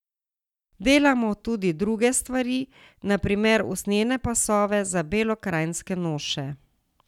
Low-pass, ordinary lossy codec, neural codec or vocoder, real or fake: 19.8 kHz; none; none; real